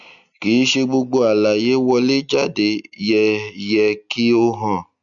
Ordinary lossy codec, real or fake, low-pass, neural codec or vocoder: none; real; 7.2 kHz; none